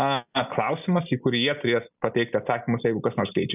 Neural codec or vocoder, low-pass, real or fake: none; 3.6 kHz; real